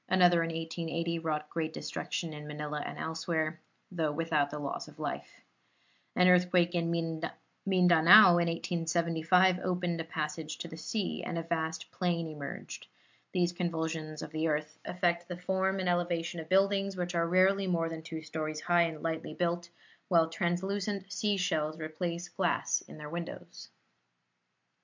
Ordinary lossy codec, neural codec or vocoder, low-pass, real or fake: MP3, 64 kbps; none; 7.2 kHz; real